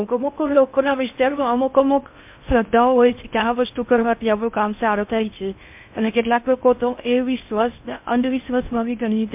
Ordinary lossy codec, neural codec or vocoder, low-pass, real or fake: MP3, 32 kbps; codec, 16 kHz in and 24 kHz out, 0.6 kbps, FocalCodec, streaming, 4096 codes; 3.6 kHz; fake